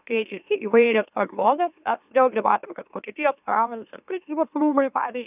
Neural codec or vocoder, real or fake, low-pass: autoencoder, 44.1 kHz, a latent of 192 numbers a frame, MeloTTS; fake; 3.6 kHz